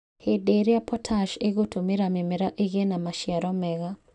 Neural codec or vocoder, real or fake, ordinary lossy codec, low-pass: none; real; none; 10.8 kHz